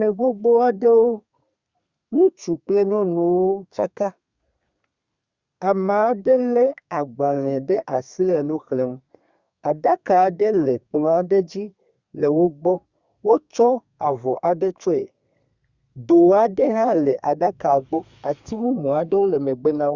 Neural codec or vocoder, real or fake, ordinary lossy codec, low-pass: codec, 32 kHz, 1.9 kbps, SNAC; fake; Opus, 64 kbps; 7.2 kHz